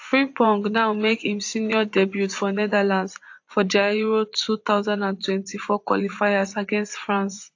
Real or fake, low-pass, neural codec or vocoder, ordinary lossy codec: fake; 7.2 kHz; vocoder, 24 kHz, 100 mel bands, Vocos; AAC, 48 kbps